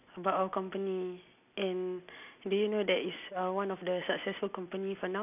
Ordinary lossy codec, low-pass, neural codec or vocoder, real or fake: none; 3.6 kHz; none; real